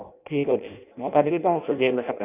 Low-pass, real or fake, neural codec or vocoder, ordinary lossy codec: 3.6 kHz; fake; codec, 16 kHz in and 24 kHz out, 0.6 kbps, FireRedTTS-2 codec; none